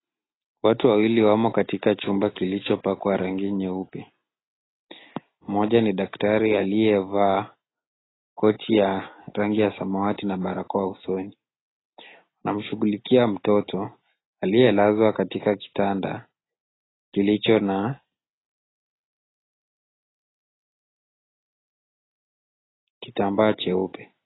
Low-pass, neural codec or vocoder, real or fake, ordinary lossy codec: 7.2 kHz; none; real; AAC, 16 kbps